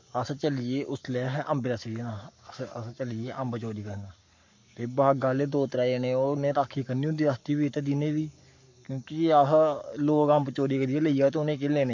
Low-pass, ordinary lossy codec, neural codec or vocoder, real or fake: 7.2 kHz; MP3, 48 kbps; codec, 44.1 kHz, 7.8 kbps, Pupu-Codec; fake